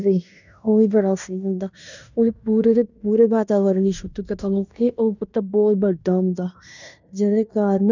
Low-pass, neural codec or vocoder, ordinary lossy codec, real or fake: 7.2 kHz; codec, 16 kHz in and 24 kHz out, 0.9 kbps, LongCat-Audio-Codec, fine tuned four codebook decoder; none; fake